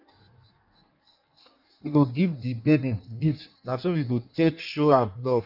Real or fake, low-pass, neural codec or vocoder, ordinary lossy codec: fake; 5.4 kHz; codec, 16 kHz in and 24 kHz out, 1.1 kbps, FireRedTTS-2 codec; none